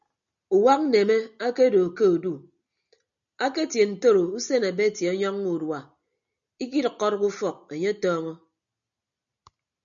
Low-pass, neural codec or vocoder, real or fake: 7.2 kHz; none; real